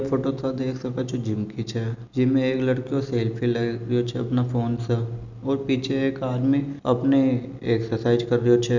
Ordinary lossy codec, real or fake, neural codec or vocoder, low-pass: none; real; none; 7.2 kHz